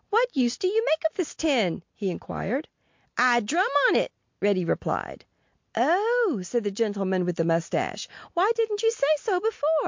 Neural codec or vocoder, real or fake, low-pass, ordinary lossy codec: none; real; 7.2 kHz; MP3, 48 kbps